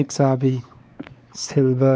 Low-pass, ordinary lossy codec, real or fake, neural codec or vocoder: none; none; fake; codec, 16 kHz, 4 kbps, X-Codec, WavLM features, trained on Multilingual LibriSpeech